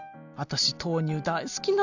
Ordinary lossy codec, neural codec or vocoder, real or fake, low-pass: none; none; real; 7.2 kHz